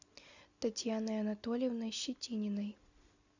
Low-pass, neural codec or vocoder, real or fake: 7.2 kHz; none; real